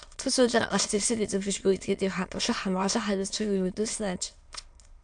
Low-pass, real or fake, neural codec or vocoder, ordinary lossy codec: 9.9 kHz; fake; autoencoder, 22.05 kHz, a latent of 192 numbers a frame, VITS, trained on many speakers; AAC, 64 kbps